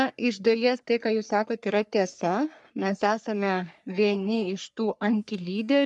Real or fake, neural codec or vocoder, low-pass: fake; codec, 44.1 kHz, 3.4 kbps, Pupu-Codec; 10.8 kHz